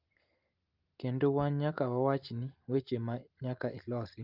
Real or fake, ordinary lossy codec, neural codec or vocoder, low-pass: real; Opus, 24 kbps; none; 5.4 kHz